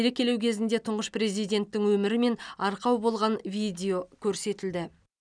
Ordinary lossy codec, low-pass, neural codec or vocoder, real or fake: none; 9.9 kHz; none; real